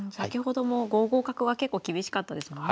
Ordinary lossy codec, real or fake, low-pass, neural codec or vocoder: none; real; none; none